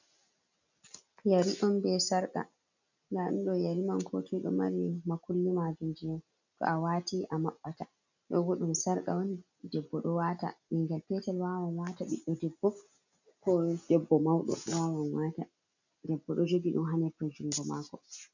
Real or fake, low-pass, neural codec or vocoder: real; 7.2 kHz; none